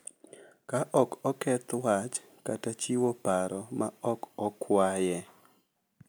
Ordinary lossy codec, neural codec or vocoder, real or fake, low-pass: none; none; real; none